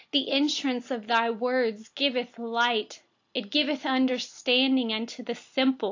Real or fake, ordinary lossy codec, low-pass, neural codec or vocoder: real; AAC, 48 kbps; 7.2 kHz; none